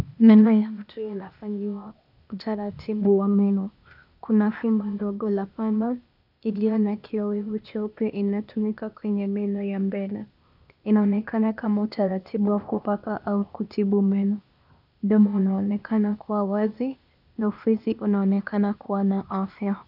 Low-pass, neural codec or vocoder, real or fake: 5.4 kHz; codec, 16 kHz, 0.8 kbps, ZipCodec; fake